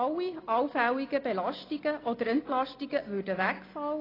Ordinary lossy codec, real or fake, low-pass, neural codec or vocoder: AAC, 24 kbps; real; 5.4 kHz; none